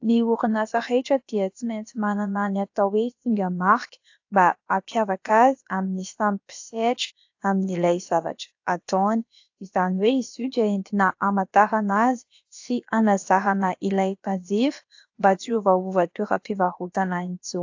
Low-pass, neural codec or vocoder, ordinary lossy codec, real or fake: 7.2 kHz; codec, 16 kHz, about 1 kbps, DyCAST, with the encoder's durations; AAC, 48 kbps; fake